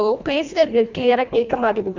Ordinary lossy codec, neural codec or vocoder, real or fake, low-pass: none; codec, 24 kHz, 1.5 kbps, HILCodec; fake; 7.2 kHz